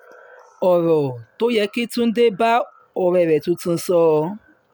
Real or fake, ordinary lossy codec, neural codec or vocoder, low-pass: real; none; none; none